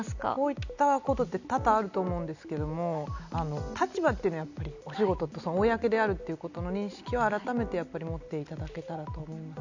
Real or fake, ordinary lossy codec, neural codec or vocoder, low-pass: real; none; none; 7.2 kHz